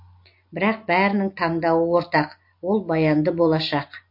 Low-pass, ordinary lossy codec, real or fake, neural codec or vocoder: 5.4 kHz; MP3, 32 kbps; real; none